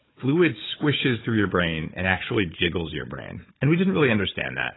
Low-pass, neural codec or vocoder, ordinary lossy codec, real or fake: 7.2 kHz; codec, 16 kHz, 4 kbps, FunCodec, trained on Chinese and English, 50 frames a second; AAC, 16 kbps; fake